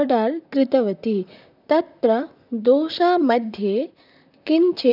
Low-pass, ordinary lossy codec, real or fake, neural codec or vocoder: 5.4 kHz; none; fake; vocoder, 44.1 kHz, 128 mel bands, Pupu-Vocoder